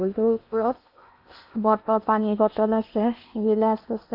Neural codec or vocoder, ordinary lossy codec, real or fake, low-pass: codec, 16 kHz in and 24 kHz out, 0.8 kbps, FocalCodec, streaming, 65536 codes; none; fake; 5.4 kHz